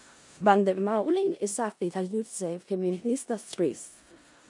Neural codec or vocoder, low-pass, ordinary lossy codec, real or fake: codec, 16 kHz in and 24 kHz out, 0.4 kbps, LongCat-Audio-Codec, four codebook decoder; 10.8 kHz; MP3, 64 kbps; fake